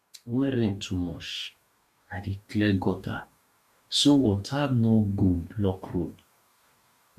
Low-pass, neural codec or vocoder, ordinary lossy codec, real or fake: 14.4 kHz; codec, 44.1 kHz, 2.6 kbps, DAC; none; fake